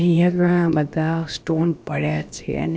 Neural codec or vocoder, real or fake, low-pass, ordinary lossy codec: codec, 16 kHz, about 1 kbps, DyCAST, with the encoder's durations; fake; none; none